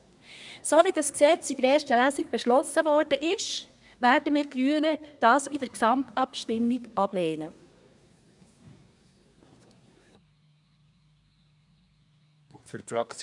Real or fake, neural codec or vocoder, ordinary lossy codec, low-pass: fake; codec, 24 kHz, 1 kbps, SNAC; none; 10.8 kHz